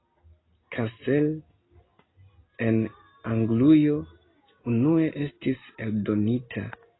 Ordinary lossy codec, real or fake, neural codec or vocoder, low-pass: AAC, 16 kbps; real; none; 7.2 kHz